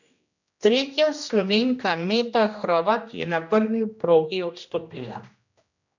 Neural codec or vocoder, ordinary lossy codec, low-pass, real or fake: codec, 16 kHz, 1 kbps, X-Codec, HuBERT features, trained on general audio; none; 7.2 kHz; fake